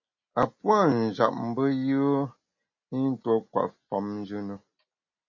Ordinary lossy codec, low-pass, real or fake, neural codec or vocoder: MP3, 32 kbps; 7.2 kHz; real; none